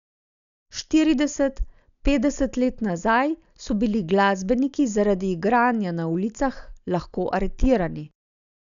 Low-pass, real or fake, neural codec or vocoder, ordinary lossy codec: 7.2 kHz; real; none; none